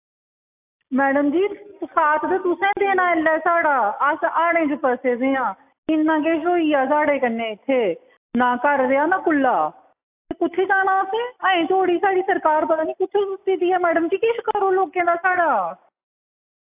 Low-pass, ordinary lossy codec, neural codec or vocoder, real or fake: 3.6 kHz; AAC, 32 kbps; none; real